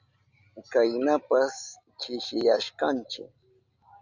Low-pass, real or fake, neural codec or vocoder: 7.2 kHz; real; none